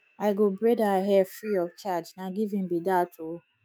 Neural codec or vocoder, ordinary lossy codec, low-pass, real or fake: autoencoder, 48 kHz, 128 numbers a frame, DAC-VAE, trained on Japanese speech; none; none; fake